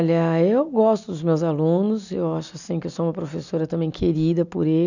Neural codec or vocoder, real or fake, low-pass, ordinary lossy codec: none; real; 7.2 kHz; none